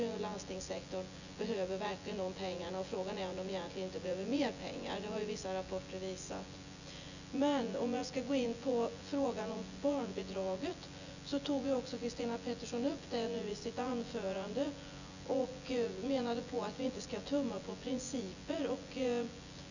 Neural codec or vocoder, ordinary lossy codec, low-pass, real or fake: vocoder, 24 kHz, 100 mel bands, Vocos; none; 7.2 kHz; fake